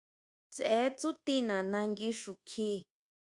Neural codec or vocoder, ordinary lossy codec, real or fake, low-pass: codec, 24 kHz, 1.2 kbps, DualCodec; Opus, 64 kbps; fake; 10.8 kHz